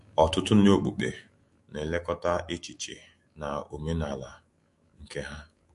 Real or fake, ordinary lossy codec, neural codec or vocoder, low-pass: fake; MP3, 48 kbps; autoencoder, 48 kHz, 128 numbers a frame, DAC-VAE, trained on Japanese speech; 14.4 kHz